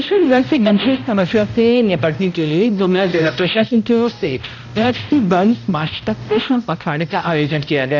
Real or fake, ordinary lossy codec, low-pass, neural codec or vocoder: fake; none; 7.2 kHz; codec, 16 kHz, 0.5 kbps, X-Codec, HuBERT features, trained on balanced general audio